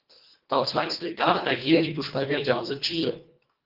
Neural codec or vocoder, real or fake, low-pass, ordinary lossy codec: codec, 24 kHz, 1.5 kbps, HILCodec; fake; 5.4 kHz; Opus, 32 kbps